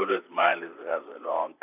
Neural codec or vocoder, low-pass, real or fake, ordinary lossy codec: vocoder, 44.1 kHz, 128 mel bands, Pupu-Vocoder; 3.6 kHz; fake; none